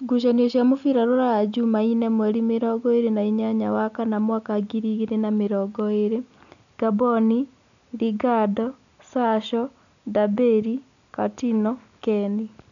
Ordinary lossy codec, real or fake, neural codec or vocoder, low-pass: none; real; none; 7.2 kHz